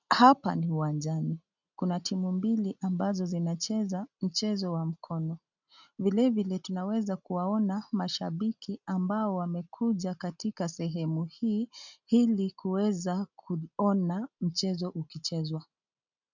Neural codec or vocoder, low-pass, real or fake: none; 7.2 kHz; real